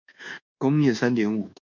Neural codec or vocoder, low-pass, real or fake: codec, 24 kHz, 1.2 kbps, DualCodec; 7.2 kHz; fake